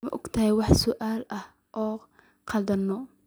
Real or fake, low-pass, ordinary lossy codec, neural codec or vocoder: real; none; none; none